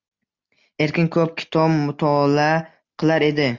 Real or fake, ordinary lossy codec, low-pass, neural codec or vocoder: real; Opus, 64 kbps; 7.2 kHz; none